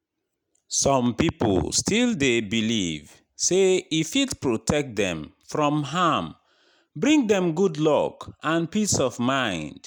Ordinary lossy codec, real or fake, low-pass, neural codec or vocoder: none; real; 19.8 kHz; none